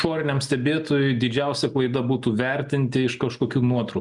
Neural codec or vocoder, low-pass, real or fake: none; 10.8 kHz; real